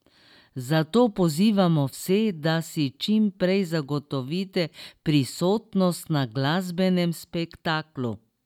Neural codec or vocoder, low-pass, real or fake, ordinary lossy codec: none; 19.8 kHz; real; none